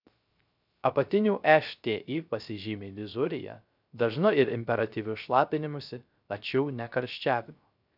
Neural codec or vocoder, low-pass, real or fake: codec, 16 kHz, 0.3 kbps, FocalCodec; 5.4 kHz; fake